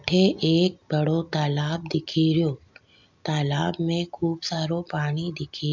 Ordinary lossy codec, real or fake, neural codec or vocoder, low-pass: MP3, 64 kbps; real; none; 7.2 kHz